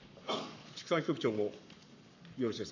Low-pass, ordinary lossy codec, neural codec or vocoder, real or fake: 7.2 kHz; none; codec, 44.1 kHz, 7.8 kbps, Pupu-Codec; fake